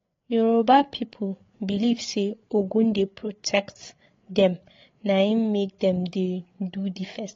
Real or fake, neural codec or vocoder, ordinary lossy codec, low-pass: fake; codec, 16 kHz, 8 kbps, FreqCodec, larger model; AAC, 32 kbps; 7.2 kHz